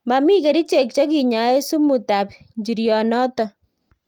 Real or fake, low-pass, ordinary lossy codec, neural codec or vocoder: real; 19.8 kHz; Opus, 32 kbps; none